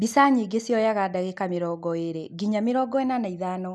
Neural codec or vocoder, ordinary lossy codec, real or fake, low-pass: none; none; real; none